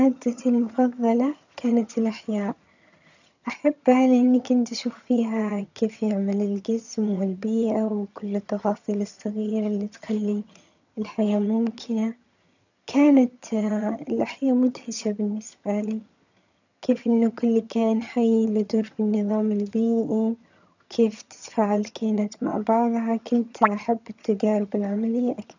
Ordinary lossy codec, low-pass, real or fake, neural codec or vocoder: none; 7.2 kHz; fake; vocoder, 22.05 kHz, 80 mel bands, HiFi-GAN